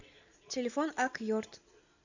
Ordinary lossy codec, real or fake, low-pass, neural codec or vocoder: AAC, 48 kbps; real; 7.2 kHz; none